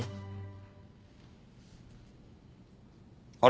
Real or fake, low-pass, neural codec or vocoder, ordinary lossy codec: real; none; none; none